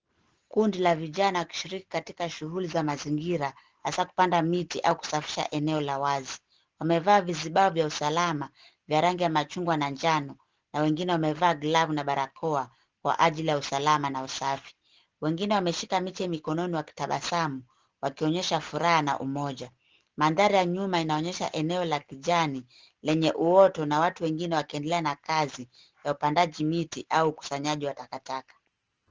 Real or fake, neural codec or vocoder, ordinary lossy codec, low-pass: real; none; Opus, 16 kbps; 7.2 kHz